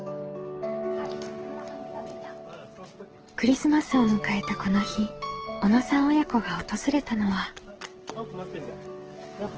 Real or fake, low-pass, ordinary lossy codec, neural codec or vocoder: fake; 7.2 kHz; Opus, 16 kbps; codec, 44.1 kHz, 7.8 kbps, DAC